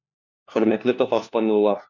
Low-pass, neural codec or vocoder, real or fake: 7.2 kHz; codec, 16 kHz, 1 kbps, FunCodec, trained on LibriTTS, 50 frames a second; fake